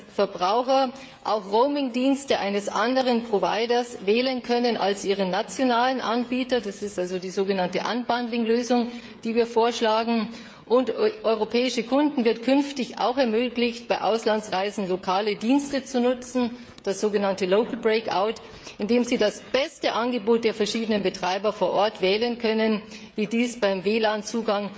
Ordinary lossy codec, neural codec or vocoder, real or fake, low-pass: none; codec, 16 kHz, 16 kbps, FreqCodec, smaller model; fake; none